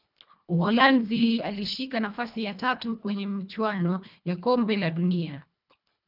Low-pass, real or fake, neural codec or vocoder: 5.4 kHz; fake; codec, 24 kHz, 1.5 kbps, HILCodec